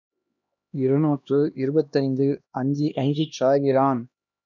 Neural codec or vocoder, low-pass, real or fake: codec, 16 kHz, 1 kbps, X-Codec, HuBERT features, trained on LibriSpeech; 7.2 kHz; fake